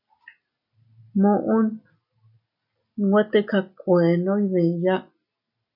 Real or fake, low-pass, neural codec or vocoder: real; 5.4 kHz; none